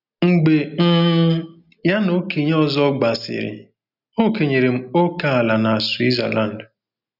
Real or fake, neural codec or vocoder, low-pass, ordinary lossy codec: real; none; 5.4 kHz; none